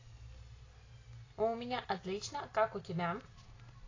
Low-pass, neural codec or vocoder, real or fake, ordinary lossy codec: 7.2 kHz; none; real; AAC, 32 kbps